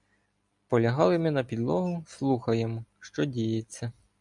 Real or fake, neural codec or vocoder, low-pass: real; none; 10.8 kHz